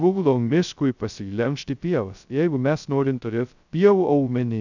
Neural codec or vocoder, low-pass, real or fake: codec, 16 kHz, 0.2 kbps, FocalCodec; 7.2 kHz; fake